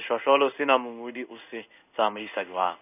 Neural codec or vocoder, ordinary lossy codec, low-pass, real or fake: codec, 16 kHz in and 24 kHz out, 1 kbps, XY-Tokenizer; none; 3.6 kHz; fake